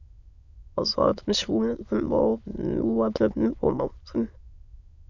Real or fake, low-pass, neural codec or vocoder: fake; 7.2 kHz; autoencoder, 22.05 kHz, a latent of 192 numbers a frame, VITS, trained on many speakers